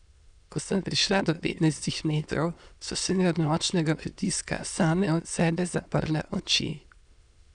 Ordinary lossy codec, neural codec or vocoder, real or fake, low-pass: none; autoencoder, 22.05 kHz, a latent of 192 numbers a frame, VITS, trained on many speakers; fake; 9.9 kHz